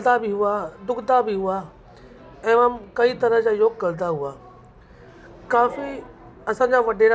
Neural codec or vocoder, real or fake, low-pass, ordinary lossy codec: none; real; none; none